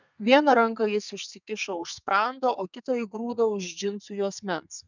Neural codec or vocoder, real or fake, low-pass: codec, 32 kHz, 1.9 kbps, SNAC; fake; 7.2 kHz